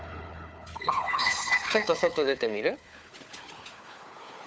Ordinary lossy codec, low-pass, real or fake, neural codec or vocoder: none; none; fake; codec, 16 kHz, 4 kbps, FunCodec, trained on Chinese and English, 50 frames a second